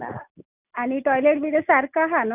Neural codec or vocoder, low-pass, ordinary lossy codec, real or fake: none; 3.6 kHz; none; real